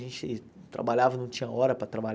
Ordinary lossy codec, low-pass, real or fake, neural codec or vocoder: none; none; real; none